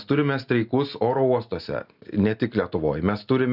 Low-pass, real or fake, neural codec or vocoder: 5.4 kHz; real; none